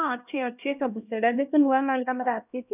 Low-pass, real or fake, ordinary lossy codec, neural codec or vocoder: 3.6 kHz; fake; none; codec, 16 kHz, 1 kbps, X-Codec, HuBERT features, trained on LibriSpeech